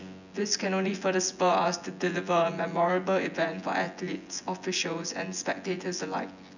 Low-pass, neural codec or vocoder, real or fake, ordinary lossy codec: 7.2 kHz; vocoder, 24 kHz, 100 mel bands, Vocos; fake; none